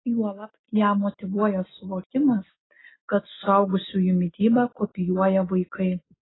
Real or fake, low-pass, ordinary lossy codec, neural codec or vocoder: real; 7.2 kHz; AAC, 16 kbps; none